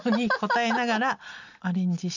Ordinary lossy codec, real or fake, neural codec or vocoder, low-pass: none; real; none; 7.2 kHz